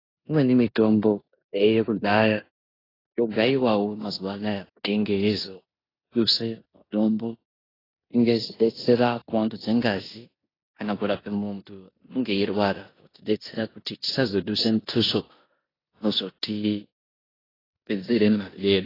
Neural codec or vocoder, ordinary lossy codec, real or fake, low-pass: codec, 16 kHz in and 24 kHz out, 0.9 kbps, LongCat-Audio-Codec, four codebook decoder; AAC, 24 kbps; fake; 5.4 kHz